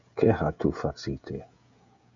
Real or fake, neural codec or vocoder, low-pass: fake; codec, 16 kHz, 16 kbps, FreqCodec, smaller model; 7.2 kHz